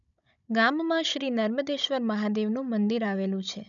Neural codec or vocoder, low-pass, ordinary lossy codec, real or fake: codec, 16 kHz, 16 kbps, FunCodec, trained on Chinese and English, 50 frames a second; 7.2 kHz; none; fake